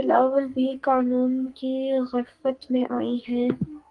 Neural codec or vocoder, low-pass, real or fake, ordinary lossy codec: codec, 44.1 kHz, 2.6 kbps, SNAC; 10.8 kHz; fake; Opus, 24 kbps